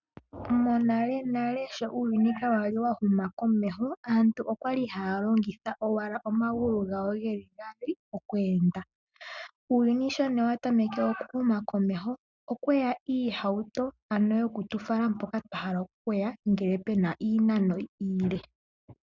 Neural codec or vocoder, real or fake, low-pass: none; real; 7.2 kHz